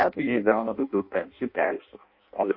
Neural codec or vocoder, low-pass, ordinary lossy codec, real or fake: codec, 16 kHz in and 24 kHz out, 0.6 kbps, FireRedTTS-2 codec; 5.4 kHz; MP3, 32 kbps; fake